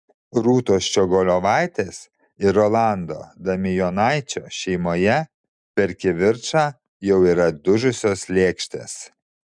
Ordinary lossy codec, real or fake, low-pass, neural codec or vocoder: MP3, 96 kbps; real; 9.9 kHz; none